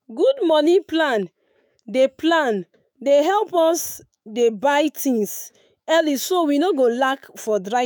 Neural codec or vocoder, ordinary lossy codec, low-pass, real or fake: autoencoder, 48 kHz, 128 numbers a frame, DAC-VAE, trained on Japanese speech; none; none; fake